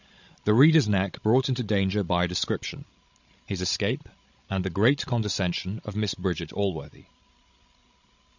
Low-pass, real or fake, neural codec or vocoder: 7.2 kHz; fake; codec, 16 kHz, 16 kbps, FreqCodec, larger model